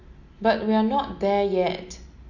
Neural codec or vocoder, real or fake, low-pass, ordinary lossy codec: none; real; 7.2 kHz; none